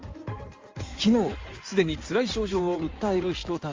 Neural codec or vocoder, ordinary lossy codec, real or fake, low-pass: codec, 16 kHz in and 24 kHz out, 2.2 kbps, FireRedTTS-2 codec; Opus, 32 kbps; fake; 7.2 kHz